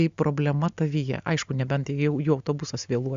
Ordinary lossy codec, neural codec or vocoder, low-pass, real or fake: Opus, 64 kbps; none; 7.2 kHz; real